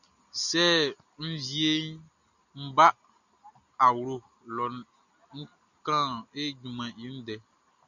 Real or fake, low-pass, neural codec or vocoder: real; 7.2 kHz; none